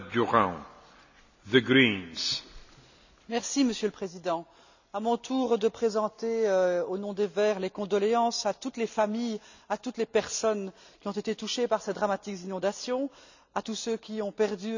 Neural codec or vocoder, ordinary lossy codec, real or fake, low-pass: none; none; real; 7.2 kHz